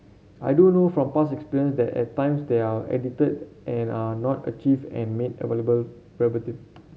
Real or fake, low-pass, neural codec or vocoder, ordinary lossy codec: real; none; none; none